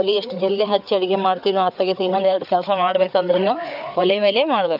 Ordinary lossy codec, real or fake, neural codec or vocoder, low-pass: none; fake; codec, 16 kHz, 4 kbps, FreqCodec, larger model; 5.4 kHz